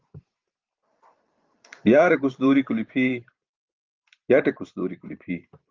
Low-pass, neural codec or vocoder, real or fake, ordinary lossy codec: 7.2 kHz; vocoder, 44.1 kHz, 128 mel bands every 512 samples, BigVGAN v2; fake; Opus, 32 kbps